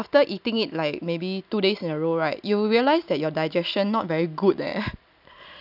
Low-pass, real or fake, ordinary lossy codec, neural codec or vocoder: 5.4 kHz; real; none; none